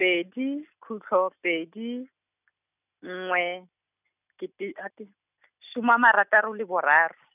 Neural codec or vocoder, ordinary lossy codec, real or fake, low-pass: none; none; real; 3.6 kHz